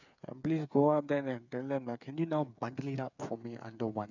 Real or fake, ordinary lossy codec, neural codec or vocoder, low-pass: fake; none; codec, 16 kHz, 8 kbps, FreqCodec, smaller model; 7.2 kHz